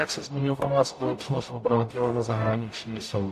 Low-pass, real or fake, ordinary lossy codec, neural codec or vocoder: 14.4 kHz; fake; AAC, 64 kbps; codec, 44.1 kHz, 0.9 kbps, DAC